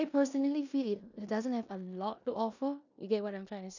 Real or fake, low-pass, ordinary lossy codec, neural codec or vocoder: fake; 7.2 kHz; none; codec, 16 kHz in and 24 kHz out, 0.9 kbps, LongCat-Audio-Codec, four codebook decoder